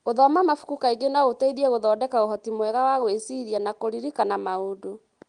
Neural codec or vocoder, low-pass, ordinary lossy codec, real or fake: none; 9.9 kHz; Opus, 24 kbps; real